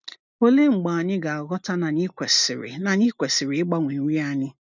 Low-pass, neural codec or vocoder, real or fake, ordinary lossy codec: 7.2 kHz; none; real; none